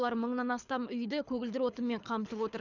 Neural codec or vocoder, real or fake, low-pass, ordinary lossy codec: codec, 24 kHz, 6 kbps, HILCodec; fake; 7.2 kHz; none